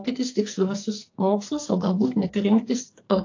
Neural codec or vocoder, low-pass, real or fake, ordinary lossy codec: codec, 44.1 kHz, 2.6 kbps, SNAC; 7.2 kHz; fake; AAC, 48 kbps